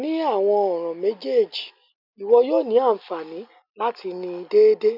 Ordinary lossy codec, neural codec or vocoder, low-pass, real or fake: none; none; 5.4 kHz; real